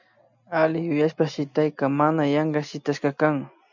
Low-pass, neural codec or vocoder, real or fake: 7.2 kHz; none; real